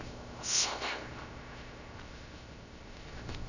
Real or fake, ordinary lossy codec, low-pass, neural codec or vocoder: fake; none; 7.2 kHz; codec, 16 kHz, 0.3 kbps, FocalCodec